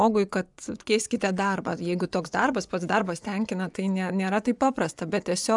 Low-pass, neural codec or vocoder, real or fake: 10.8 kHz; vocoder, 24 kHz, 100 mel bands, Vocos; fake